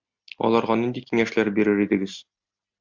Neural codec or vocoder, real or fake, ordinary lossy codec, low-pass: none; real; MP3, 64 kbps; 7.2 kHz